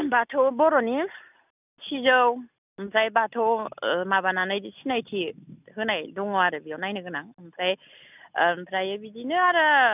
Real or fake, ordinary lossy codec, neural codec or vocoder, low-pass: real; none; none; 3.6 kHz